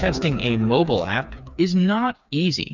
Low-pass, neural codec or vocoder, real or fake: 7.2 kHz; codec, 16 kHz, 4 kbps, FreqCodec, smaller model; fake